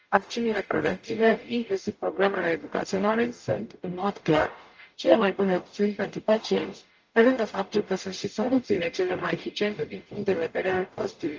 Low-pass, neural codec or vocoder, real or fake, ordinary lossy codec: 7.2 kHz; codec, 44.1 kHz, 0.9 kbps, DAC; fake; Opus, 24 kbps